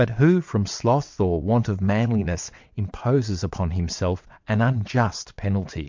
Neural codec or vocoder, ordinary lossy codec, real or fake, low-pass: vocoder, 22.05 kHz, 80 mel bands, WaveNeXt; MP3, 64 kbps; fake; 7.2 kHz